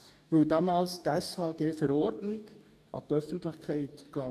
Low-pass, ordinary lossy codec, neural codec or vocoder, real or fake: 14.4 kHz; none; codec, 44.1 kHz, 2.6 kbps, DAC; fake